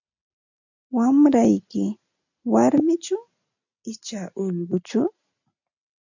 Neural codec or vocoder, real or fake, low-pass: none; real; 7.2 kHz